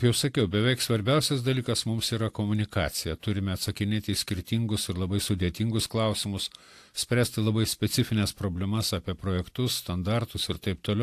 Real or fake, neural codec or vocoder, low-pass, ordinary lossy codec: real; none; 14.4 kHz; AAC, 64 kbps